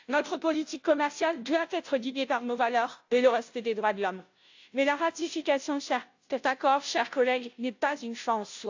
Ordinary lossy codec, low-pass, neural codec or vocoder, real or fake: AAC, 48 kbps; 7.2 kHz; codec, 16 kHz, 0.5 kbps, FunCodec, trained on Chinese and English, 25 frames a second; fake